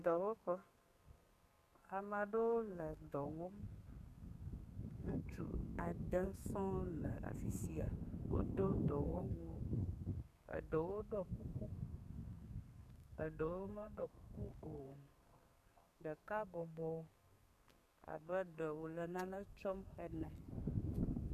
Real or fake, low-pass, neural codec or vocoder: fake; 14.4 kHz; codec, 32 kHz, 1.9 kbps, SNAC